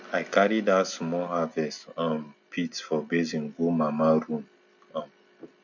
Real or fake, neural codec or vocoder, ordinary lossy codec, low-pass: real; none; none; 7.2 kHz